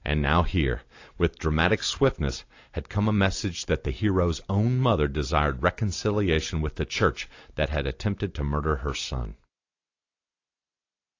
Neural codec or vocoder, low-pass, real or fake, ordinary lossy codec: none; 7.2 kHz; real; AAC, 48 kbps